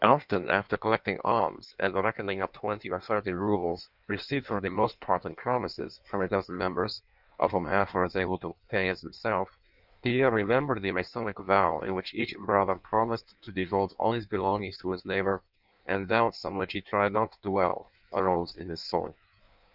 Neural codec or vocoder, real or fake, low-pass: codec, 16 kHz in and 24 kHz out, 1.1 kbps, FireRedTTS-2 codec; fake; 5.4 kHz